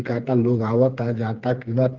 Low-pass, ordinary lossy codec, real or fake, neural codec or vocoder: 7.2 kHz; Opus, 32 kbps; fake; codec, 16 kHz, 4 kbps, FreqCodec, smaller model